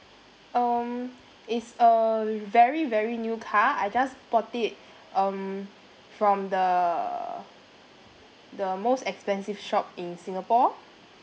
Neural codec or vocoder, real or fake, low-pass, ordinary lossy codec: none; real; none; none